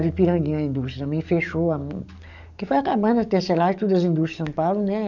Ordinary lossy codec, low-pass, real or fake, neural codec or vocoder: none; 7.2 kHz; fake; codec, 44.1 kHz, 7.8 kbps, DAC